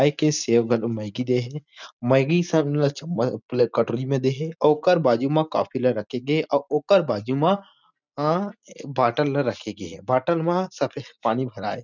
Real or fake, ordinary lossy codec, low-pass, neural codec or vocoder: real; none; 7.2 kHz; none